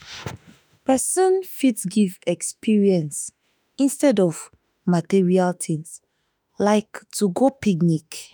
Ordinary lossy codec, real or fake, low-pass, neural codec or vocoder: none; fake; none; autoencoder, 48 kHz, 32 numbers a frame, DAC-VAE, trained on Japanese speech